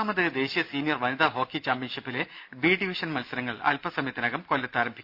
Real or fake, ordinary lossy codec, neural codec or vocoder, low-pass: real; Opus, 64 kbps; none; 5.4 kHz